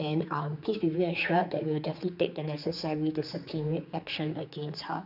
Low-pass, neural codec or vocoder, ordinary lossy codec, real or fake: 5.4 kHz; codec, 16 kHz, 4 kbps, X-Codec, HuBERT features, trained on general audio; none; fake